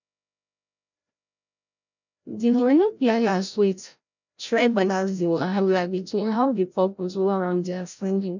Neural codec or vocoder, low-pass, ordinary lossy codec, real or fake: codec, 16 kHz, 0.5 kbps, FreqCodec, larger model; 7.2 kHz; none; fake